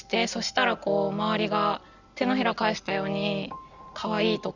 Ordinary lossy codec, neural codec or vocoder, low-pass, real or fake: none; none; 7.2 kHz; real